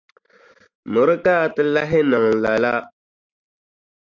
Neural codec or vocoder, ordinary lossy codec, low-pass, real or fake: none; MP3, 64 kbps; 7.2 kHz; real